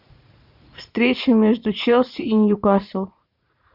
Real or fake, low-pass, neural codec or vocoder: fake; 5.4 kHz; vocoder, 44.1 kHz, 80 mel bands, Vocos